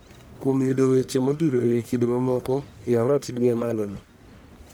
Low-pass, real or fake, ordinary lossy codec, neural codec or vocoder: none; fake; none; codec, 44.1 kHz, 1.7 kbps, Pupu-Codec